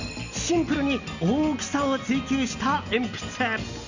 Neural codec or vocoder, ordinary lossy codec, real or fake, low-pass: none; Opus, 64 kbps; real; 7.2 kHz